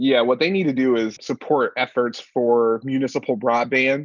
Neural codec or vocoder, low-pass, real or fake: none; 7.2 kHz; real